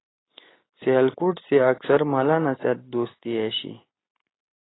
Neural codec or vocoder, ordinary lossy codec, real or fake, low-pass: none; AAC, 16 kbps; real; 7.2 kHz